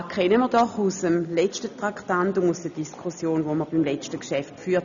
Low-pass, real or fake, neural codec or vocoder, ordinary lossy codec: 7.2 kHz; real; none; none